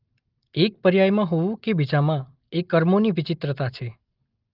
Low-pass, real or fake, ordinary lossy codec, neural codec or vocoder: 5.4 kHz; real; Opus, 32 kbps; none